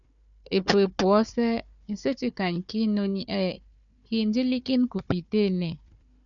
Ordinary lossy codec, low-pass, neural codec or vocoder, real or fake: MP3, 96 kbps; 7.2 kHz; codec, 16 kHz, 4 kbps, FunCodec, trained on Chinese and English, 50 frames a second; fake